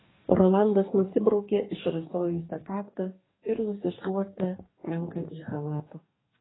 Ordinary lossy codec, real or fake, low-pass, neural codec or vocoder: AAC, 16 kbps; fake; 7.2 kHz; codec, 44.1 kHz, 2.6 kbps, DAC